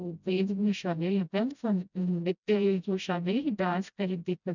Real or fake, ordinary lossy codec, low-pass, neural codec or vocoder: fake; none; 7.2 kHz; codec, 16 kHz, 0.5 kbps, FreqCodec, smaller model